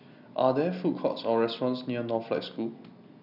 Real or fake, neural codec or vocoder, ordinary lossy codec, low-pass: real; none; none; 5.4 kHz